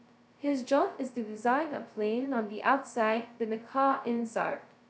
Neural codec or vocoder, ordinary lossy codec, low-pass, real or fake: codec, 16 kHz, 0.2 kbps, FocalCodec; none; none; fake